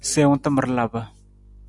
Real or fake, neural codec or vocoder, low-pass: real; none; 10.8 kHz